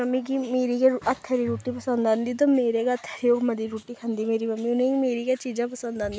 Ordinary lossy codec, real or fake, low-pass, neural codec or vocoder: none; real; none; none